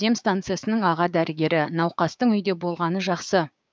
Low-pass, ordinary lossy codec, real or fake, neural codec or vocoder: none; none; fake; codec, 16 kHz, 6 kbps, DAC